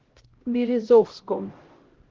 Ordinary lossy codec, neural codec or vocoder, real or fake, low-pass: Opus, 16 kbps; codec, 16 kHz, 0.5 kbps, X-Codec, HuBERT features, trained on LibriSpeech; fake; 7.2 kHz